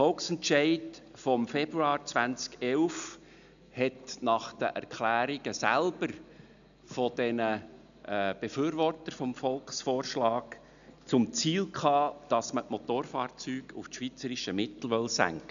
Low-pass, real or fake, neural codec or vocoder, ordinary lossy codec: 7.2 kHz; real; none; none